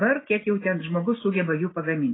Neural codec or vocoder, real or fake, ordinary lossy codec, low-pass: none; real; AAC, 16 kbps; 7.2 kHz